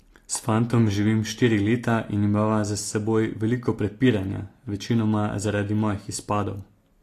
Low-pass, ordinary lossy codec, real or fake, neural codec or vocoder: 14.4 kHz; AAC, 48 kbps; real; none